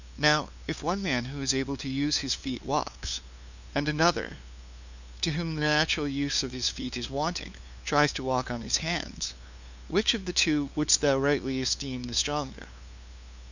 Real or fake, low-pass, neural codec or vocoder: fake; 7.2 kHz; codec, 16 kHz, 2 kbps, FunCodec, trained on LibriTTS, 25 frames a second